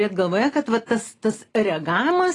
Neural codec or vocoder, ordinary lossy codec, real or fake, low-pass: none; AAC, 32 kbps; real; 10.8 kHz